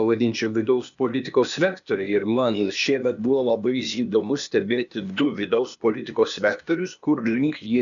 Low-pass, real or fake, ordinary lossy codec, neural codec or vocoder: 7.2 kHz; fake; MP3, 96 kbps; codec, 16 kHz, 0.8 kbps, ZipCodec